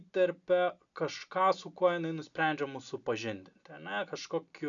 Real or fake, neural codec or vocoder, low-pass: real; none; 7.2 kHz